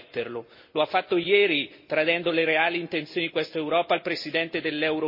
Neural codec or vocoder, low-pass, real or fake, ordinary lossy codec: none; 5.4 kHz; real; MP3, 24 kbps